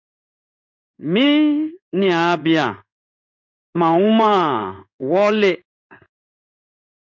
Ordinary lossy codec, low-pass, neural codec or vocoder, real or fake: MP3, 64 kbps; 7.2 kHz; codec, 16 kHz in and 24 kHz out, 1 kbps, XY-Tokenizer; fake